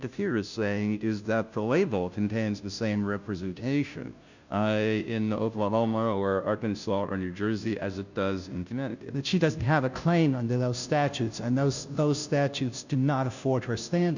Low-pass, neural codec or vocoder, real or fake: 7.2 kHz; codec, 16 kHz, 0.5 kbps, FunCodec, trained on Chinese and English, 25 frames a second; fake